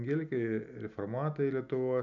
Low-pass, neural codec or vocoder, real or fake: 7.2 kHz; none; real